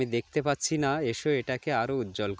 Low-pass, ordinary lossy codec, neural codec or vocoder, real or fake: none; none; none; real